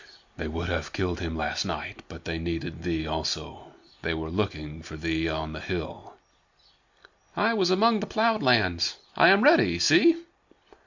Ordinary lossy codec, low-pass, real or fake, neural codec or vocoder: Opus, 64 kbps; 7.2 kHz; real; none